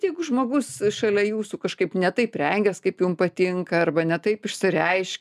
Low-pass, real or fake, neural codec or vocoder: 14.4 kHz; real; none